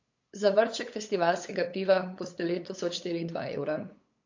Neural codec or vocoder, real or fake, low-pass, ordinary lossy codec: codec, 16 kHz, 8 kbps, FunCodec, trained on LibriTTS, 25 frames a second; fake; 7.2 kHz; AAC, 48 kbps